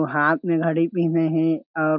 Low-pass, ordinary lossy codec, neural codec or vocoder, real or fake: 5.4 kHz; MP3, 48 kbps; none; real